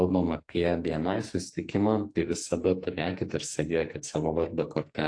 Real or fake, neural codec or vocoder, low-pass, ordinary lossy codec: fake; codec, 44.1 kHz, 2.6 kbps, SNAC; 9.9 kHz; AAC, 48 kbps